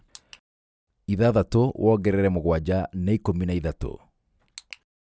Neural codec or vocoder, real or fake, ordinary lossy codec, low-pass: none; real; none; none